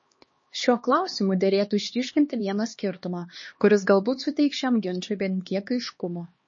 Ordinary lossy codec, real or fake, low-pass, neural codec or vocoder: MP3, 32 kbps; fake; 7.2 kHz; codec, 16 kHz, 2 kbps, X-Codec, HuBERT features, trained on LibriSpeech